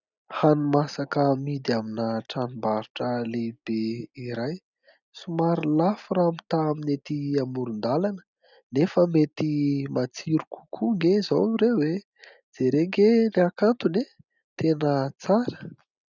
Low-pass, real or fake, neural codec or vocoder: 7.2 kHz; real; none